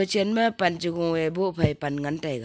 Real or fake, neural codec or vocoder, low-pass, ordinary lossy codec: real; none; none; none